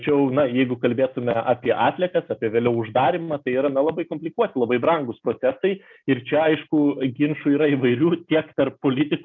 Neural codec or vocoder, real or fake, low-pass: none; real; 7.2 kHz